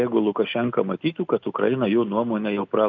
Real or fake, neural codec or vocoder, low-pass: real; none; 7.2 kHz